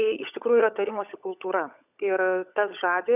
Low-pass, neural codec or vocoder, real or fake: 3.6 kHz; codec, 16 kHz, 16 kbps, FunCodec, trained on LibriTTS, 50 frames a second; fake